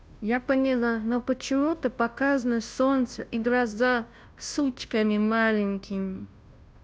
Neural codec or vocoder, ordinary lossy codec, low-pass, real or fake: codec, 16 kHz, 0.5 kbps, FunCodec, trained on Chinese and English, 25 frames a second; none; none; fake